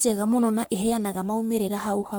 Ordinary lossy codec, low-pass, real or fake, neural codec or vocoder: none; none; fake; codec, 44.1 kHz, 3.4 kbps, Pupu-Codec